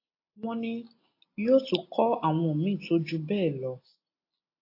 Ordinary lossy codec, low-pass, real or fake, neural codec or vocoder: AAC, 32 kbps; 5.4 kHz; real; none